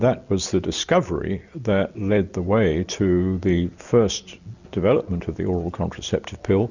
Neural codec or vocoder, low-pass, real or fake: none; 7.2 kHz; real